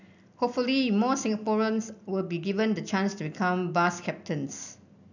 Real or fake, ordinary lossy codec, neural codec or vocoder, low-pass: real; none; none; 7.2 kHz